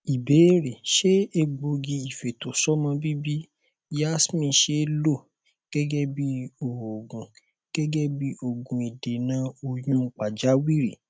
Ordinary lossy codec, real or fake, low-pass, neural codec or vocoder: none; real; none; none